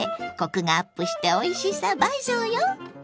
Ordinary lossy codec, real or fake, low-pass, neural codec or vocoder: none; real; none; none